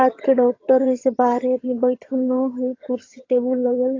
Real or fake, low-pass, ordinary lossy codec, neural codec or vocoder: fake; 7.2 kHz; AAC, 32 kbps; vocoder, 22.05 kHz, 80 mel bands, HiFi-GAN